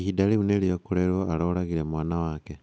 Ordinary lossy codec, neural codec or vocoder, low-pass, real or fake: none; none; none; real